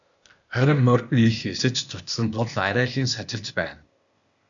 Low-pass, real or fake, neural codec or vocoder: 7.2 kHz; fake; codec, 16 kHz, 0.8 kbps, ZipCodec